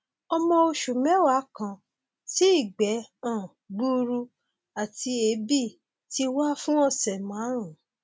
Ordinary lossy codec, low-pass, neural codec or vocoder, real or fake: none; none; none; real